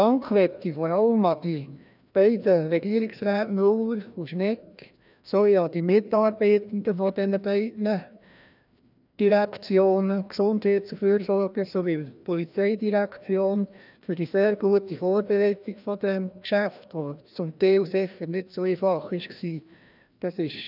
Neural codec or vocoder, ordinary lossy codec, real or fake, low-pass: codec, 16 kHz, 1 kbps, FreqCodec, larger model; none; fake; 5.4 kHz